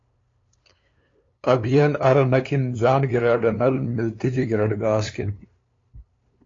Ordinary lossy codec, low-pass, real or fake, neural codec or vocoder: AAC, 32 kbps; 7.2 kHz; fake; codec, 16 kHz, 2 kbps, FunCodec, trained on LibriTTS, 25 frames a second